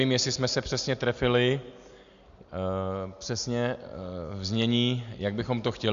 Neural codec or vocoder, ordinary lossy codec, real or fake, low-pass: none; Opus, 64 kbps; real; 7.2 kHz